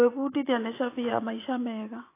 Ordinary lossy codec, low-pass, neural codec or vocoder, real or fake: AAC, 24 kbps; 3.6 kHz; vocoder, 24 kHz, 100 mel bands, Vocos; fake